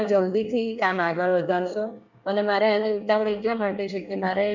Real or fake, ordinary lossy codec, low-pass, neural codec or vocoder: fake; none; 7.2 kHz; codec, 24 kHz, 1 kbps, SNAC